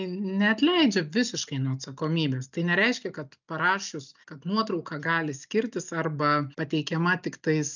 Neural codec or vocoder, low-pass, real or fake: none; 7.2 kHz; real